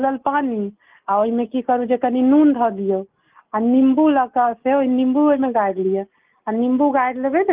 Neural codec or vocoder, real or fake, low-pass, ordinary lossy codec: none; real; 3.6 kHz; Opus, 32 kbps